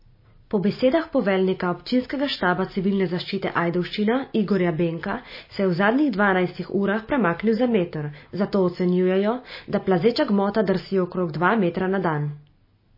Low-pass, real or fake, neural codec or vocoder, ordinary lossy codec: 5.4 kHz; real; none; MP3, 24 kbps